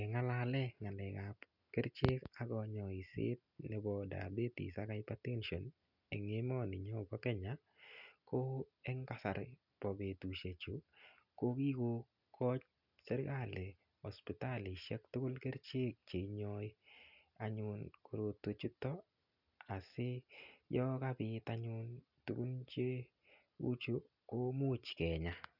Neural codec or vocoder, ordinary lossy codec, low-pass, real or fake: none; none; 5.4 kHz; real